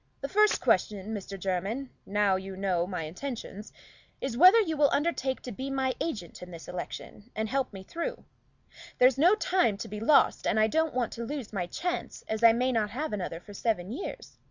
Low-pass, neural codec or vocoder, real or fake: 7.2 kHz; none; real